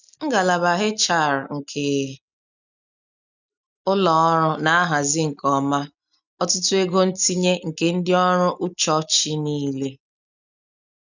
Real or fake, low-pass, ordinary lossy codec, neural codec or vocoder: real; 7.2 kHz; none; none